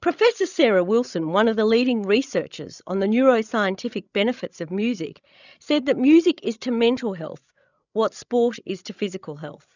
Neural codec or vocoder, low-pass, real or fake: codec, 16 kHz, 16 kbps, FreqCodec, larger model; 7.2 kHz; fake